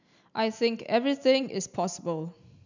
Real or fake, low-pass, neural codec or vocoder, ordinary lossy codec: real; 7.2 kHz; none; none